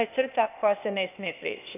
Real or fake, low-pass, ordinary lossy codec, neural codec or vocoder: fake; 3.6 kHz; none; codec, 16 kHz, 0.8 kbps, ZipCodec